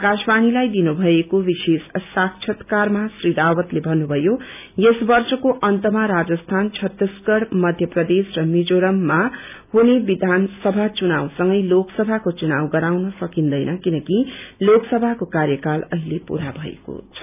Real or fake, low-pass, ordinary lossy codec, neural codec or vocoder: real; 3.6 kHz; none; none